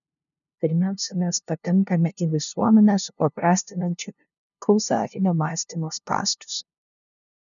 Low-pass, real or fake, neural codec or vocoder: 7.2 kHz; fake; codec, 16 kHz, 0.5 kbps, FunCodec, trained on LibriTTS, 25 frames a second